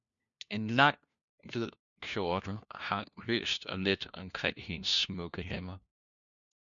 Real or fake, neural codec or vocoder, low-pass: fake; codec, 16 kHz, 1 kbps, FunCodec, trained on LibriTTS, 50 frames a second; 7.2 kHz